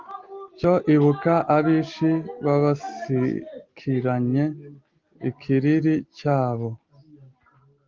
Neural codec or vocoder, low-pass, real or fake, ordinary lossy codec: none; 7.2 kHz; real; Opus, 32 kbps